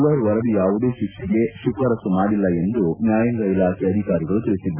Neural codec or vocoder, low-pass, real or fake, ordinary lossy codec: none; 3.6 kHz; real; none